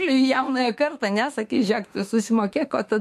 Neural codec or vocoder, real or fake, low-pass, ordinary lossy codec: autoencoder, 48 kHz, 32 numbers a frame, DAC-VAE, trained on Japanese speech; fake; 14.4 kHz; MP3, 64 kbps